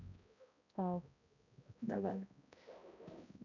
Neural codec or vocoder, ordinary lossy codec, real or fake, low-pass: codec, 16 kHz, 0.5 kbps, X-Codec, HuBERT features, trained on balanced general audio; none; fake; 7.2 kHz